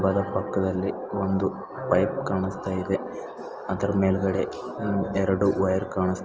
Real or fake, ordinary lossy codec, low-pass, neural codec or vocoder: real; Opus, 24 kbps; 7.2 kHz; none